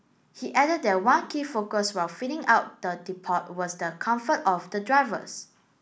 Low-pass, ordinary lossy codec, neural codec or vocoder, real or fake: none; none; none; real